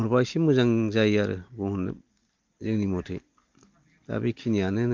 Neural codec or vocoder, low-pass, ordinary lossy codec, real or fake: none; 7.2 kHz; Opus, 32 kbps; real